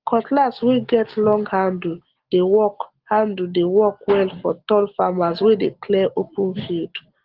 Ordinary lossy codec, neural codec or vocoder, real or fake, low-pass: Opus, 16 kbps; none; real; 5.4 kHz